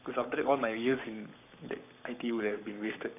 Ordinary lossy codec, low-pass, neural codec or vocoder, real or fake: none; 3.6 kHz; codec, 44.1 kHz, 7.8 kbps, Pupu-Codec; fake